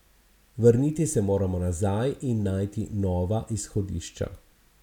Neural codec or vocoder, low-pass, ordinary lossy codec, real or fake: none; 19.8 kHz; none; real